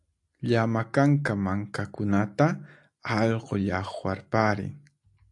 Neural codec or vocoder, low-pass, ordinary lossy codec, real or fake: none; 10.8 kHz; AAC, 64 kbps; real